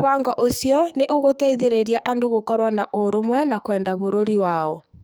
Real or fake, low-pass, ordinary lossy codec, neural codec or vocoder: fake; none; none; codec, 44.1 kHz, 2.6 kbps, SNAC